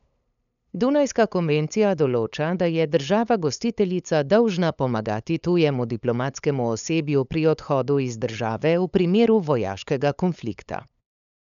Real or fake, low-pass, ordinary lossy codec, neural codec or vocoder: fake; 7.2 kHz; none; codec, 16 kHz, 8 kbps, FunCodec, trained on LibriTTS, 25 frames a second